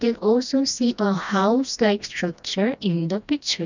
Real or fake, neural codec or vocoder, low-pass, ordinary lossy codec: fake; codec, 16 kHz, 1 kbps, FreqCodec, smaller model; 7.2 kHz; none